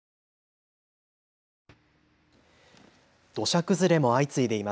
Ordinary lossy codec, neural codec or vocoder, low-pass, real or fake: none; none; none; real